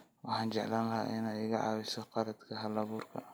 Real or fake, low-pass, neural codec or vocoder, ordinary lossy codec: real; none; none; none